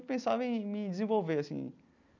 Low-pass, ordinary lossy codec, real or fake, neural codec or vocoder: 7.2 kHz; none; real; none